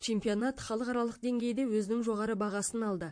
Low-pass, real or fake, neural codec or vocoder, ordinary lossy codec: 9.9 kHz; fake; vocoder, 44.1 kHz, 128 mel bands, Pupu-Vocoder; MP3, 48 kbps